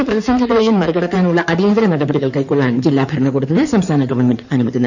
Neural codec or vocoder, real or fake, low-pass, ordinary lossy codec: codec, 16 kHz in and 24 kHz out, 2.2 kbps, FireRedTTS-2 codec; fake; 7.2 kHz; none